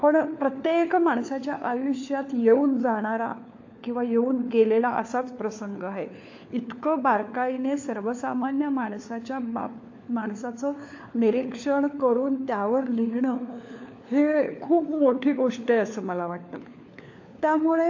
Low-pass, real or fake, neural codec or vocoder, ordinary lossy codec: 7.2 kHz; fake; codec, 16 kHz, 4 kbps, FunCodec, trained on LibriTTS, 50 frames a second; AAC, 48 kbps